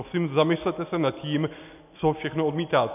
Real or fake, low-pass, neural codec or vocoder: real; 3.6 kHz; none